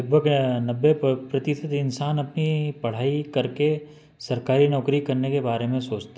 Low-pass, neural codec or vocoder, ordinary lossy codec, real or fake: none; none; none; real